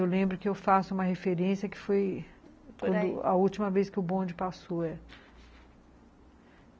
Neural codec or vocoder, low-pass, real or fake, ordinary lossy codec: none; none; real; none